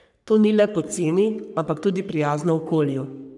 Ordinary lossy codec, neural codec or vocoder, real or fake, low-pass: none; codec, 44.1 kHz, 3.4 kbps, Pupu-Codec; fake; 10.8 kHz